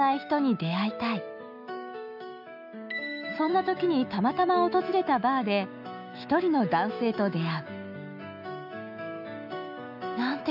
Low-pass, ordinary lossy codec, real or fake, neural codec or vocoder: 5.4 kHz; none; fake; autoencoder, 48 kHz, 128 numbers a frame, DAC-VAE, trained on Japanese speech